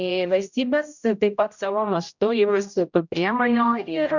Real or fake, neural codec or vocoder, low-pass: fake; codec, 16 kHz, 0.5 kbps, X-Codec, HuBERT features, trained on general audio; 7.2 kHz